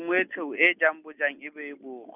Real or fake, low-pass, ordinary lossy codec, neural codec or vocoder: real; 3.6 kHz; none; none